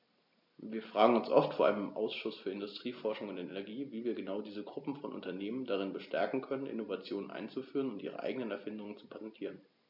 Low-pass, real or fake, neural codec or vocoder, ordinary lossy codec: 5.4 kHz; real; none; MP3, 48 kbps